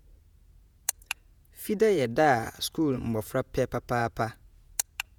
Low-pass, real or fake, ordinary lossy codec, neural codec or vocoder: none; fake; none; vocoder, 48 kHz, 128 mel bands, Vocos